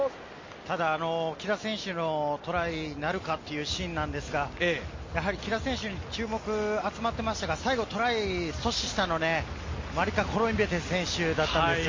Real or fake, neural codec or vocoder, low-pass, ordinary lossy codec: real; none; 7.2 kHz; MP3, 32 kbps